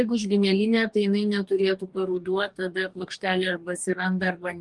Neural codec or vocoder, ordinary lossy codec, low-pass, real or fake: codec, 44.1 kHz, 2.6 kbps, DAC; Opus, 24 kbps; 10.8 kHz; fake